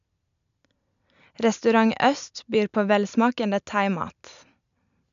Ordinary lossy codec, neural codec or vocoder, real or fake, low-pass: none; none; real; 7.2 kHz